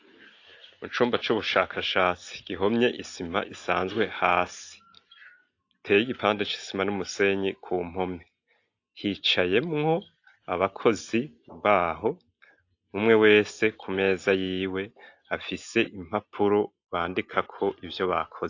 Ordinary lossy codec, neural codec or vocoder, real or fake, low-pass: AAC, 48 kbps; none; real; 7.2 kHz